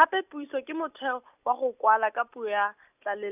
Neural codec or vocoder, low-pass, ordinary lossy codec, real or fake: none; 3.6 kHz; none; real